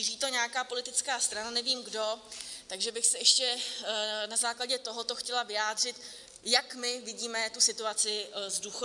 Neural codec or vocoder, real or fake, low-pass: none; real; 10.8 kHz